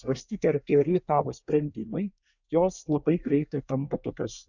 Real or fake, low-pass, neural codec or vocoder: fake; 7.2 kHz; codec, 24 kHz, 1 kbps, SNAC